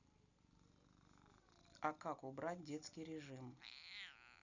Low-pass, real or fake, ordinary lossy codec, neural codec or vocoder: 7.2 kHz; real; none; none